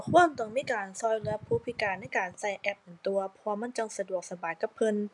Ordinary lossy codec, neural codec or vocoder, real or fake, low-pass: none; none; real; 10.8 kHz